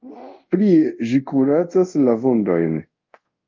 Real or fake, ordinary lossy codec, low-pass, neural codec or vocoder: fake; Opus, 24 kbps; 7.2 kHz; codec, 24 kHz, 0.5 kbps, DualCodec